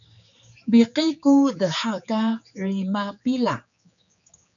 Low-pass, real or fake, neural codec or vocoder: 7.2 kHz; fake; codec, 16 kHz, 4 kbps, X-Codec, HuBERT features, trained on general audio